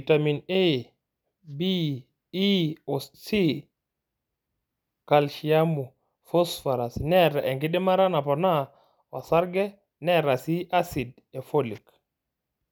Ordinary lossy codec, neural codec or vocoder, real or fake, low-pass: none; none; real; none